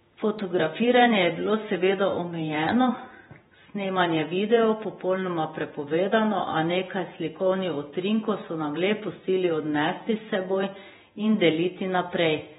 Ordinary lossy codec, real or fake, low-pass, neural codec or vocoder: AAC, 16 kbps; fake; 19.8 kHz; vocoder, 48 kHz, 128 mel bands, Vocos